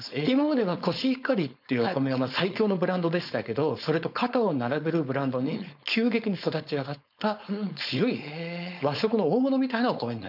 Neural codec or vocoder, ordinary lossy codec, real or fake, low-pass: codec, 16 kHz, 4.8 kbps, FACodec; none; fake; 5.4 kHz